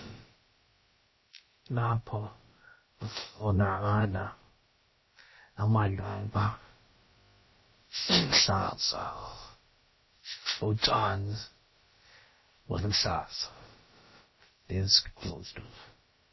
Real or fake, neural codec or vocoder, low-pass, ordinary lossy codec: fake; codec, 16 kHz, about 1 kbps, DyCAST, with the encoder's durations; 7.2 kHz; MP3, 24 kbps